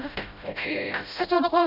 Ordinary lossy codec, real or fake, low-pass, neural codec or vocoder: none; fake; 5.4 kHz; codec, 16 kHz, 0.5 kbps, FreqCodec, smaller model